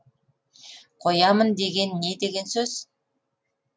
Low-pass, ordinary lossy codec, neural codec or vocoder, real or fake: none; none; none; real